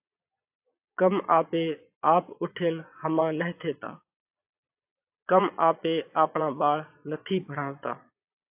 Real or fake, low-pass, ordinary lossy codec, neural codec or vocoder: fake; 3.6 kHz; AAC, 32 kbps; vocoder, 22.05 kHz, 80 mel bands, Vocos